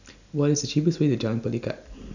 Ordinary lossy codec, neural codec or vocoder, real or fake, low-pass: none; none; real; 7.2 kHz